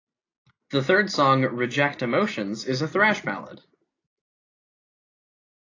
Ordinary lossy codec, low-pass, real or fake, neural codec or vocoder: AAC, 32 kbps; 7.2 kHz; real; none